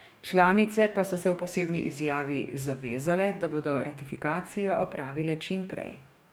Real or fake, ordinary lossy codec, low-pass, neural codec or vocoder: fake; none; none; codec, 44.1 kHz, 2.6 kbps, DAC